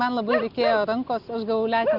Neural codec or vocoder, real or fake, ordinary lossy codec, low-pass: none; real; Opus, 32 kbps; 5.4 kHz